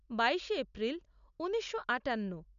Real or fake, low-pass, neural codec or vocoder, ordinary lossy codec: real; 7.2 kHz; none; none